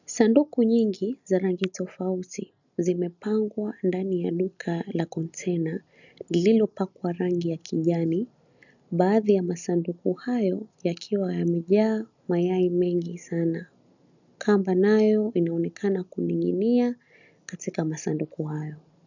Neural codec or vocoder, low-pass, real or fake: none; 7.2 kHz; real